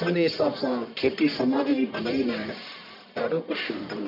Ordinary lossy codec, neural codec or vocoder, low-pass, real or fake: MP3, 48 kbps; codec, 44.1 kHz, 1.7 kbps, Pupu-Codec; 5.4 kHz; fake